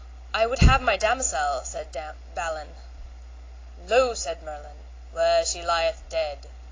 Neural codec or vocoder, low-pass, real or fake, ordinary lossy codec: none; 7.2 kHz; real; AAC, 32 kbps